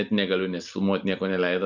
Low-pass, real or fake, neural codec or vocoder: 7.2 kHz; real; none